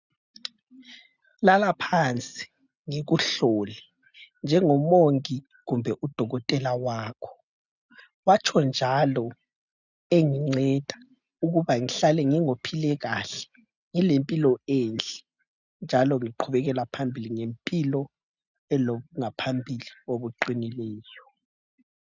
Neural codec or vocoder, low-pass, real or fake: none; 7.2 kHz; real